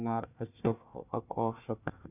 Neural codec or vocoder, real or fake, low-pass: codec, 16 kHz, 1 kbps, FunCodec, trained on Chinese and English, 50 frames a second; fake; 3.6 kHz